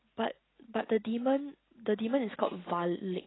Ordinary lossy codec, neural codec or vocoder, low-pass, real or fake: AAC, 16 kbps; none; 7.2 kHz; real